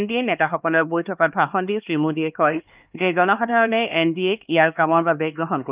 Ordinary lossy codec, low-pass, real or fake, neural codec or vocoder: Opus, 24 kbps; 3.6 kHz; fake; codec, 16 kHz, 2 kbps, X-Codec, HuBERT features, trained on LibriSpeech